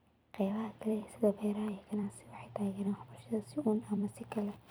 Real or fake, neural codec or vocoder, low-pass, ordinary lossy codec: fake; vocoder, 44.1 kHz, 128 mel bands every 256 samples, BigVGAN v2; none; none